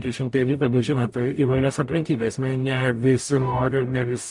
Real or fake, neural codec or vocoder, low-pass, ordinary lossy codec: fake; codec, 44.1 kHz, 0.9 kbps, DAC; 10.8 kHz; MP3, 64 kbps